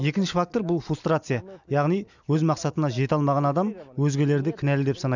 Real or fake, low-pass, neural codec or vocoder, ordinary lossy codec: real; 7.2 kHz; none; none